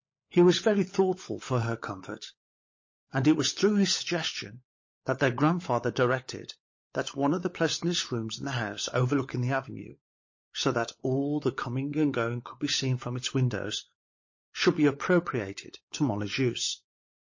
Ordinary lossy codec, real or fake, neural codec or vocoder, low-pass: MP3, 32 kbps; fake; codec, 16 kHz, 16 kbps, FunCodec, trained on LibriTTS, 50 frames a second; 7.2 kHz